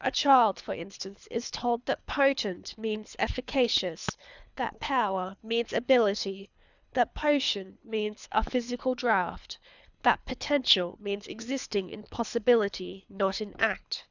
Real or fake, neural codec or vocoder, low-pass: fake; codec, 24 kHz, 3 kbps, HILCodec; 7.2 kHz